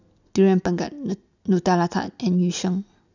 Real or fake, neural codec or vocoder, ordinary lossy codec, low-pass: real; none; none; 7.2 kHz